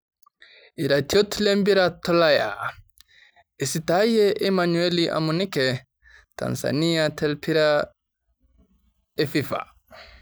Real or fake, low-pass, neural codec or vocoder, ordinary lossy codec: real; none; none; none